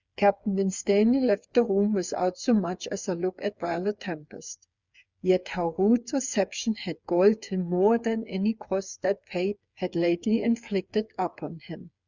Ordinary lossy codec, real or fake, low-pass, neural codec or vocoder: Opus, 64 kbps; fake; 7.2 kHz; codec, 16 kHz, 8 kbps, FreqCodec, smaller model